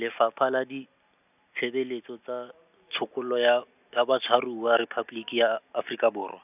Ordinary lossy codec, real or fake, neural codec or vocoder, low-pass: none; real; none; 3.6 kHz